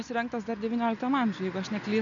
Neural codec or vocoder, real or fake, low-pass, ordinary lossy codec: none; real; 7.2 kHz; AAC, 64 kbps